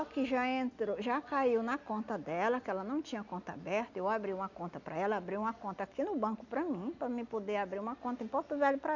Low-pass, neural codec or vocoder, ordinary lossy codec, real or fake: 7.2 kHz; none; none; real